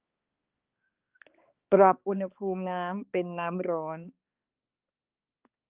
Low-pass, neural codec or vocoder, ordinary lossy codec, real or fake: 3.6 kHz; codec, 16 kHz, 2 kbps, X-Codec, HuBERT features, trained on balanced general audio; Opus, 24 kbps; fake